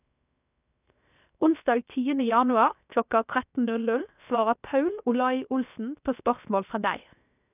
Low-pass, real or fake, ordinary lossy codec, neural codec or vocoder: 3.6 kHz; fake; none; codec, 16 kHz, 0.7 kbps, FocalCodec